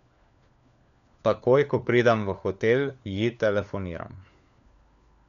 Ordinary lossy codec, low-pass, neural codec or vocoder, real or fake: none; 7.2 kHz; codec, 16 kHz, 4 kbps, FunCodec, trained on LibriTTS, 50 frames a second; fake